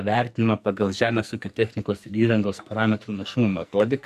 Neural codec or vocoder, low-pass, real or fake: codec, 44.1 kHz, 2.6 kbps, SNAC; 14.4 kHz; fake